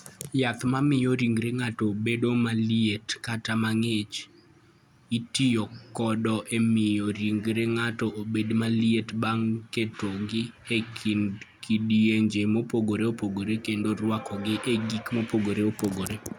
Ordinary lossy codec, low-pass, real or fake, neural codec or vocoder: none; 19.8 kHz; real; none